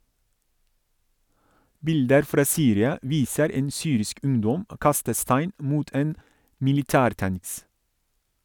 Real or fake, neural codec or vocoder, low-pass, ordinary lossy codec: real; none; none; none